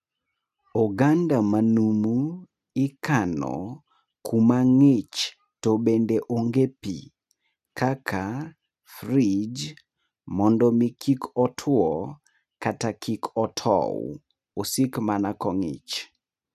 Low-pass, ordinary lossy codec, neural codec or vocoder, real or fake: 14.4 kHz; none; none; real